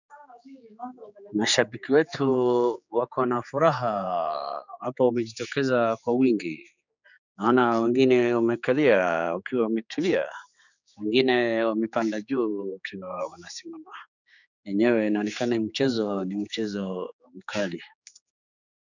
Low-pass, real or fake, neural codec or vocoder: 7.2 kHz; fake; codec, 16 kHz, 4 kbps, X-Codec, HuBERT features, trained on general audio